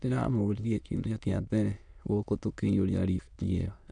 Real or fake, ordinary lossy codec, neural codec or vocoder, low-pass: fake; none; autoencoder, 22.05 kHz, a latent of 192 numbers a frame, VITS, trained on many speakers; 9.9 kHz